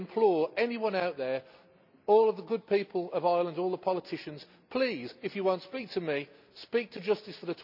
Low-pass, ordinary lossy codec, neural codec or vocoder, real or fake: 5.4 kHz; none; none; real